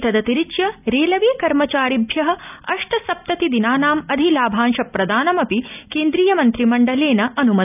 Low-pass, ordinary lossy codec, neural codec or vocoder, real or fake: 3.6 kHz; none; none; real